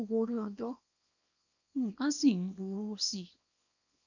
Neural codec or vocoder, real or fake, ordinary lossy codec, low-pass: codec, 24 kHz, 0.9 kbps, WavTokenizer, small release; fake; none; 7.2 kHz